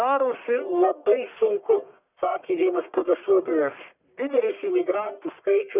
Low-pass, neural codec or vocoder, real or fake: 3.6 kHz; codec, 44.1 kHz, 1.7 kbps, Pupu-Codec; fake